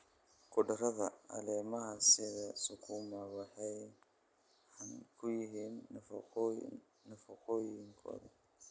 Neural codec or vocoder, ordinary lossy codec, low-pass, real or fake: none; none; none; real